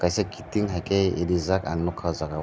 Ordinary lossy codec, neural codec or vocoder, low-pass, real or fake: none; none; none; real